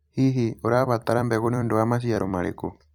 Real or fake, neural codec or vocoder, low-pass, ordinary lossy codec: fake; vocoder, 44.1 kHz, 128 mel bands every 256 samples, BigVGAN v2; 19.8 kHz; none